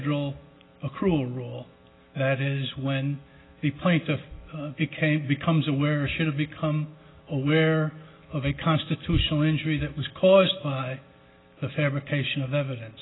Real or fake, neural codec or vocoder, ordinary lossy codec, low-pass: real; none; AAC, 16 kbps; 7.2 kHz